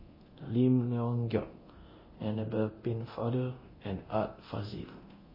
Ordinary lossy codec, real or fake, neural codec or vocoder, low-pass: MP3, 24 kbps; fake; codec, 24 kHz, 0.9 kbps, DualCodec; 5.4 kHz